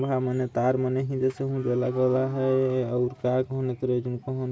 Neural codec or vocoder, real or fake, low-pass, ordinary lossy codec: none; real; none; none